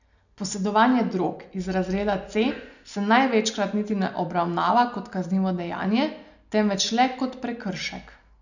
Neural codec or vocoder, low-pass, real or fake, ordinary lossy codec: none; 7.2 kHz; real; none